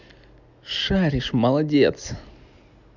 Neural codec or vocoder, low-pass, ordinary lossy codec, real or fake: none; 7.2 kHz; none; real